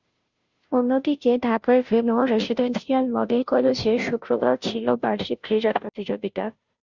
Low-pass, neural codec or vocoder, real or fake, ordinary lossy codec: 7.2 kHz; codec, 16 kHz, 0.5 kbps, FunCodec, trained on Chinese and English, 25 frames a second; fake; Opus, 64 kbps